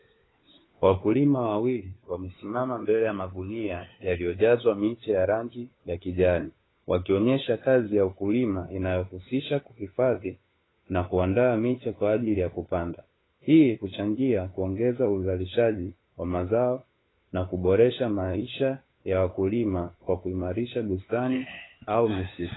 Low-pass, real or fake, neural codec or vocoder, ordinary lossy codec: 7.2 kHz; fake; codec, 16 kHz, 4 kbps, FunCodec, trained on LibriTTS, 50 frames a second; AAC, 16 kbps